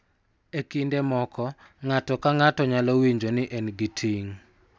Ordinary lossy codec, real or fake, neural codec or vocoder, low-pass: none; real; none; none